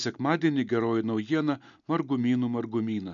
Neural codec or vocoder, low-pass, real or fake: none; 7.2 kHz; real